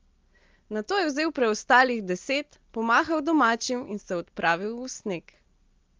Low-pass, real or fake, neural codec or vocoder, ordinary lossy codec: 7.2 kHz; real; none; Opus, 32 kbps